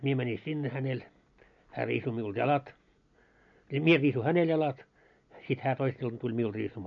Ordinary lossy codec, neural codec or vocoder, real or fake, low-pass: MP3, 48 kbps; none; real; 7.2 kHz